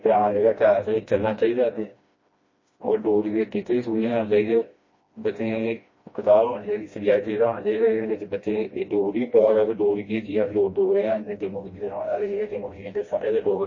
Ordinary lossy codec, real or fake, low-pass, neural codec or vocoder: MP3, 32 kbps; fake; 7.2 kHz; codec, 16 kHz, 1 kbps, FreqCodec, smaller model